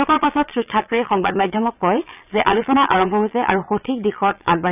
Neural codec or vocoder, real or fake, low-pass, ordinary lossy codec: vocoder, 22.05 kHz, 80 mel bands, Vocos; fake; 3.6 kHz; none